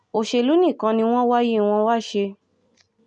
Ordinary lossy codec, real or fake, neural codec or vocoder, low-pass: none; real; none; 9.9 kHz